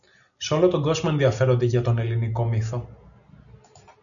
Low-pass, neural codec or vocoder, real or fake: 7.2 kHz; none; real